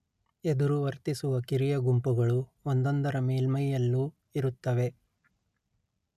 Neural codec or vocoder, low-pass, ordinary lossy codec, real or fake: none; 14.4 kHz; none; real